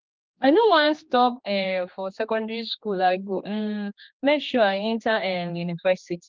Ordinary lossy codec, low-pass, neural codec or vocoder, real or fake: Opus, 32 kbps; 7.2 kHz; codec, 16 kHz, 2 kbps, X-Codec, HuBERT features, trained on general audio; fake